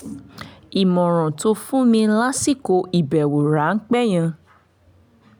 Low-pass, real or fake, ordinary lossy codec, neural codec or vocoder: none; real; none; none